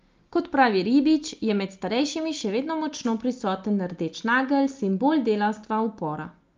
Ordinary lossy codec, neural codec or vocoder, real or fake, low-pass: Opus, 32 kbps; none; real; 7.2 kHz